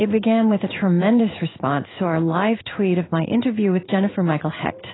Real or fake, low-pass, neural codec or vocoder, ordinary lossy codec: fake; 7.2 kHz; codec, 16 kHz in and 24 kHz out, 1 kbps, XY-Tokenizer; AAC, 16 kbps